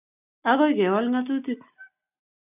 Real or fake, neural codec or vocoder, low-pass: real; none; 3.6 kHz